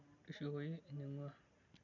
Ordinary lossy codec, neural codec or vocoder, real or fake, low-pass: none; none; real; 7.2 kHz